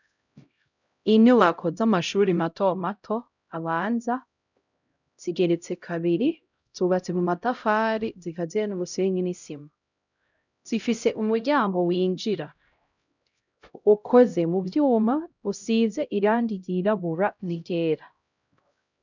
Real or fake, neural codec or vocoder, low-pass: fake; codec, 16 kHz, 0.5 kbps, X-Codec, HuBERT features, trained on LibriSpeech; 7.2 kHz